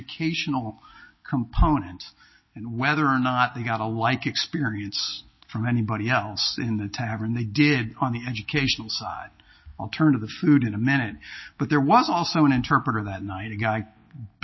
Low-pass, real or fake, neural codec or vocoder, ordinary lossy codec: 7.2 kHz; real; none; MP3, 24 kbps